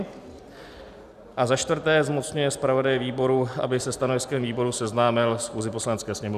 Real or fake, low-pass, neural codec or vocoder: real; 14.4 kHz; none